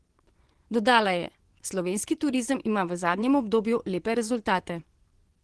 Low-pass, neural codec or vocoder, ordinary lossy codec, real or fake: 10.8 kHz; vocoder, 24 kHz, 100 mel bands, Vocos; Opus, 16 kbps; fake